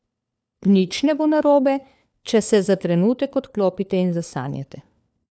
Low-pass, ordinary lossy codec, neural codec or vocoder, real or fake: none; none; codec, 16 kHz, 4 kbps, FunCodec, trained on LibriTTS, 50 frames a second; fake